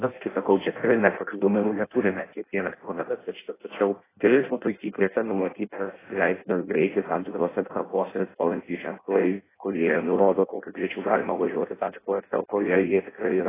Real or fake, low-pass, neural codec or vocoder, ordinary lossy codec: fake; 3.6 kHz; codec, 16 kHz in and 24 kHz out, 0.6 kbps, FireRedTTS-2 codec; AAC, 16 kbps